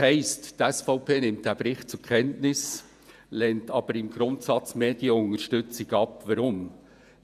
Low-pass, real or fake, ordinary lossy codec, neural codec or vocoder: 14.4 kHz; fake; none; vocoder, 48 kHz, 128 mel bands, Vocos